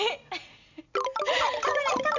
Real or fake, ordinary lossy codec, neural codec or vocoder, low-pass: real; none; none; 7.2 kHz